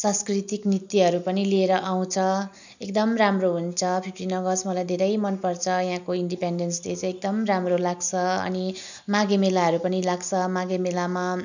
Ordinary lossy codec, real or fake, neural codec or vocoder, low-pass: none; real; none; 7.2 kHz